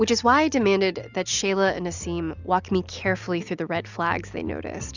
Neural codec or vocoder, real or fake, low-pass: none; real; 7.2 kHz